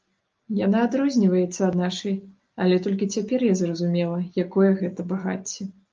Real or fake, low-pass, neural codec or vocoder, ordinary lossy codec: real; 7.2 kHz; none; Opus, 24 kbps